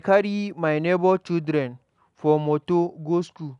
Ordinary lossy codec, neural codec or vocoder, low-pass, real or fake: none; none; 10.8 kHz; real